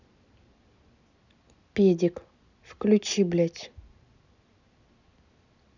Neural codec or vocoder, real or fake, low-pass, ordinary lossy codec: none; real; 7.2 kHz; none